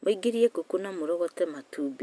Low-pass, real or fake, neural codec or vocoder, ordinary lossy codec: none; real; none; none